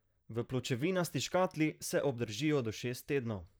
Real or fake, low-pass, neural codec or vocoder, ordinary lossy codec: fake; none; vocoder, 44.1 kHz, 128 mel bands, Pupu-Vocoder; none